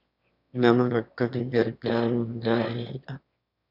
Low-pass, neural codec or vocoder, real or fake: 5.4 kHz; autoencoder, 22.05 kHz, a latent of 192 numbers a frame, VITS, trained on one speaker; fake